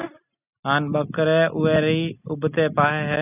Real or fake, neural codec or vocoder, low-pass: real; none; 3.6 kHz